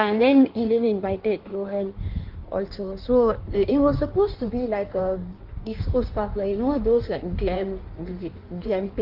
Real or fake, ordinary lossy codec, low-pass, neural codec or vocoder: fake; Opus, 32 kbps; 5.4 kHz; codec, 16 kHz in and 24 kHz out, 1.1 kbps, FireRedTTS-2 codec